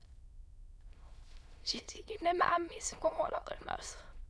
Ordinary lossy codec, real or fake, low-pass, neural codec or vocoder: none; fake; none; autoencoder, 22.05 kHz, a latent of 192 numbers a frame, VITS, trained on many speakers